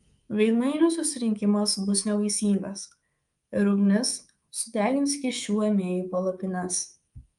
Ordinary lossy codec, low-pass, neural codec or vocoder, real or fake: Opus, 32 kbps; 10.8 kHz; codec, 24 kHz, 3.1 kbps, DualCodec; fake